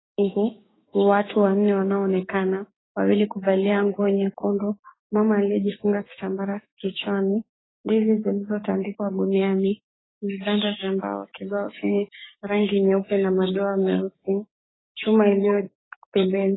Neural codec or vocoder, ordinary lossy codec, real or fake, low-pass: codec, 16 kHz, 6 kbps, DAC; AAC, 16 kbps; fake; 7.2 kHz